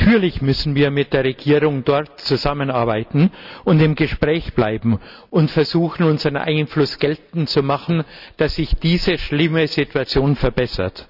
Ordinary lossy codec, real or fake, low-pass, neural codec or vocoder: none; real; 5.4 kHz; none